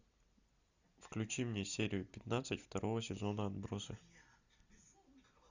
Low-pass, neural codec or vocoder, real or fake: 7.2 kHz; none; real